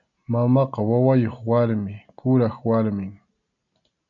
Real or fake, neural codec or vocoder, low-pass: real; none; 7.2 kHz